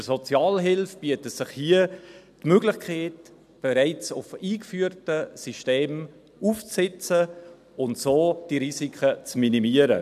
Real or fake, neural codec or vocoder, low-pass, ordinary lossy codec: real; none; 14.4 kHz; none